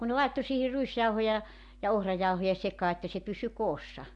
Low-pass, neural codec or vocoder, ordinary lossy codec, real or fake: 10.8 kHz; none; MP3, 64 kbps; real